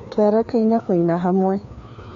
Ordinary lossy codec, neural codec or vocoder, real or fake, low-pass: MP3, 48 kbps; codec, 16 kHz, 2 kbps, FreqCodec, larger model; fake; 7.2 kHz